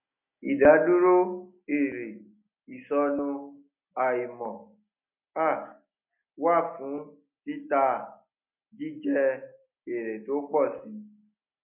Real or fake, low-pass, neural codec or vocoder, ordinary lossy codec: real; 3.6 kHz; none; none